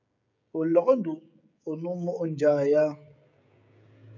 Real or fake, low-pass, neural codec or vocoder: fake; 7.2 kHz; codec, 16 kHz, 16 kbps, FreqCodec, smaller model